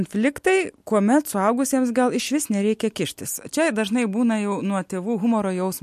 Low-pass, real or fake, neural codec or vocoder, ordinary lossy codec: 14.4 kHz; real; none; MP3, 64 kbps